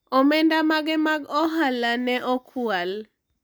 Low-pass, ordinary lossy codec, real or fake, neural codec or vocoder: none; none; real; none